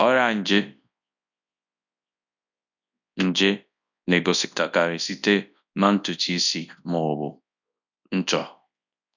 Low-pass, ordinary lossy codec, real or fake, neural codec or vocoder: 7.2 kHz; none; fake; codec, 24 kHz, 0.9 kbps, WavTokenizer, large speech release